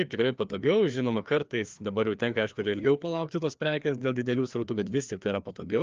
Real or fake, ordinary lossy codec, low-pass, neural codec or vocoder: fake; Opus, 24 kbps; 7.2 kHz; codec, 16 kHz, 2 kbps, FreqCodec, larger model